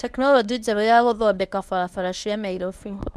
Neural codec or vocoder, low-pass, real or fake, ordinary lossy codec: codec, 24 kHz, 0.9 kbps, WavTokenizer, medium speech release version 1; none; fake; none